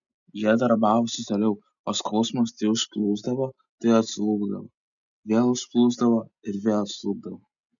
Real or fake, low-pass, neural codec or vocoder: real; 7.2 kHz; none